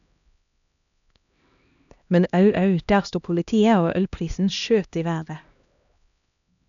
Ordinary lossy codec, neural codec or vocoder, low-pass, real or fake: none; codec, 16 kHz, 1 kbps, X-Codec, HuBERT features, trained on LibriSpeech; 7.2 kHz; fake